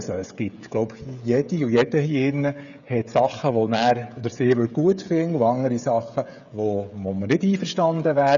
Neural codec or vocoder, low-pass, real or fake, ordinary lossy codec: codec, 16 kHz, 16 kbps, FreqCodec, smaller model; 7.2 kHz; fake; none